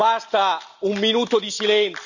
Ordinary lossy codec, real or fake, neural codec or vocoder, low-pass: none; real; none; 7.2 kHz